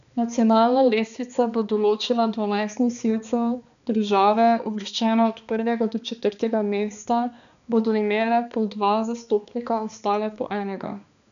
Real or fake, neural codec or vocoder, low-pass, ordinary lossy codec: fake; codec, 16 kHz, 2 kbps, X-Codec, HuBERT features, trained on balanced general audio; 7.2 kHz; none